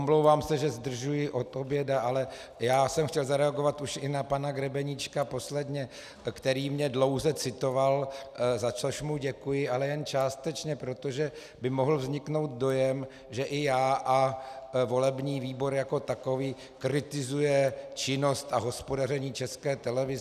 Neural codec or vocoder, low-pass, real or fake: none; 14.4 kHz; real